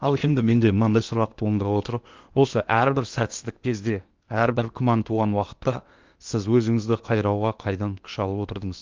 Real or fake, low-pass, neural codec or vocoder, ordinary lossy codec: fake; 7.2 kHz; codec, 16 kHz in and 24 kHz out, 0.8 kbps, FocalCodec, streaming, 65536 codes; Opus, 32 kbps